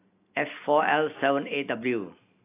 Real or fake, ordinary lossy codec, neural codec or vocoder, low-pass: real; AAC, 24 kbps; none; 3.6 kHz